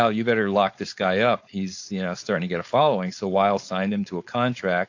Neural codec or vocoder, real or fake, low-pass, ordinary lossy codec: codec, 16 kHz, 4.8 kbps, FACodec; fake; 7.2 kHz; AAC, 48 kbps